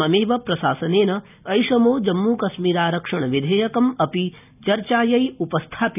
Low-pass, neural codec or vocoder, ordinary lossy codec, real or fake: 3.6 kHz; none; none; real